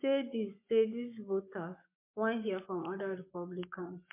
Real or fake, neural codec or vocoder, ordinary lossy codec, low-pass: fake; codec, 44.1 kHz, 7.8 kbps, Pupu-Codec; MP3, 24 kbps; 3.6 kHz